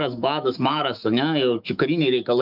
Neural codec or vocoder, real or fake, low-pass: autoencoder, 48 kHz, 128 numbers a frame, DAC-VAE, trained on Japanese speech; fake; 5.4 kHz